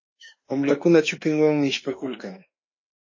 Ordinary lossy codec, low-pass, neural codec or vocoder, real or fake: MP3, 32 kbps; 7.2 kHz; autoencoder, 48 kHz, 32 numbers a frame, DAC-VAE, trained on Japanese speech; fake